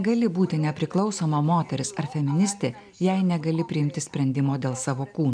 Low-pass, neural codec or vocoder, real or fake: 9.9 kHz; none; real